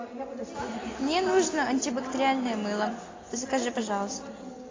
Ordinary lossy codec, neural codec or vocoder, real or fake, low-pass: AAC, 32 kbps; none; real; 7.2 kHz